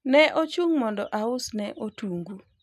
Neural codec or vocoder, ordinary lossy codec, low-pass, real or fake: none; none; 14.4 kHz; real